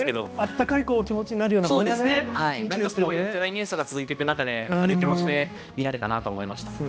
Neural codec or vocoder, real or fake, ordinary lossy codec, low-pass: codec, 16 kHz, 1 kbps, X-Codec, HuBERT features, trained on balanced general audio; fake; none; none